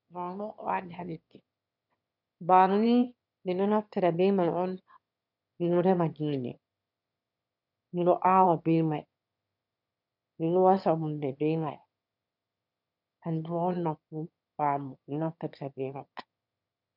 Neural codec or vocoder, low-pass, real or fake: autoencoder, 22.05 kHz, a latent of 192 numbers a frame, VITS, trained on one speaker; 5.4 kHz; fake